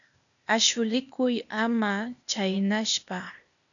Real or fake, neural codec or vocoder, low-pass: fake; codec, 16 kHz, 0.8 kbps, ZipCodec; 7.2 kHz